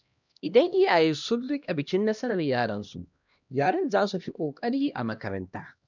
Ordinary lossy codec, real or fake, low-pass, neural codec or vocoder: none; fake; 7.2 kHz; codec, 16 kHz, 1 kbps, X-Codec, HuBERT features, trained on LibriSpeech